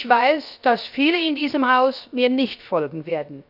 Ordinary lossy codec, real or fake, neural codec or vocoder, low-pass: none; fake; codec, 16 kHz, 0.7 kbps, FocalCodec; 5.4 kHz